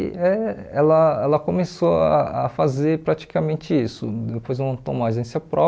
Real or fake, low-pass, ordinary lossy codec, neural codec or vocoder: real; none; none; none